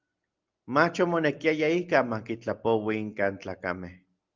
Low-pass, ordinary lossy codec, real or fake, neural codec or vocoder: 7.2 kHz; Opus, 24 kbps; real; none